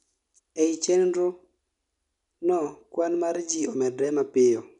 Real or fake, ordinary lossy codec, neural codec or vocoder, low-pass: real; none; none; 10.8 kHz